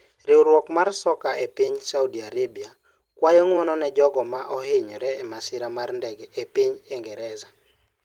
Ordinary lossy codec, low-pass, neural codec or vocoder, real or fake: Opus, 24 kbps; 19.8 kHz; vocoder, 44.1 kHz, 128 mel bands every 256 samples, BigVGAN v2; fake